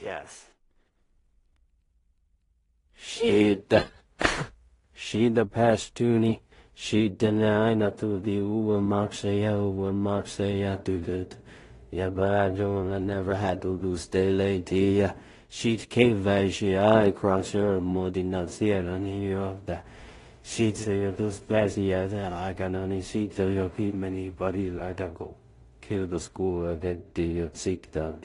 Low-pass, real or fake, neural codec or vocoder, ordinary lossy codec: 10.8 kHz; fake; codec, 16 kHz in and 24 kHz out, 0.4 kbps, LongCat-Audio-Codec, two codebook decoder; AAC, 32 kbps